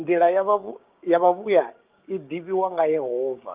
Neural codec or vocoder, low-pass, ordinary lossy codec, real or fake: codec, 16 kHz, 6 kbps, DAC; 3.6 kHz; Opus, 24 kbps; fake